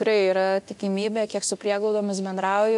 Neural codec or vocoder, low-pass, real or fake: codec, 24 kHz, 0.9 kbps, DualCodec; 10.8 kHz; fake